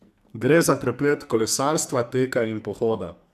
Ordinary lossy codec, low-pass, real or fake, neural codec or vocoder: none; 14.4 kHz; fake; codec, 44.1 kHz, 2.6 kbps, SNAC